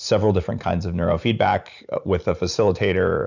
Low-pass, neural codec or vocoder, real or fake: 7.2 kHz; vocoder, 44.1 kHz, 128 mel bands every 512 samples, BigVGAN v2; fake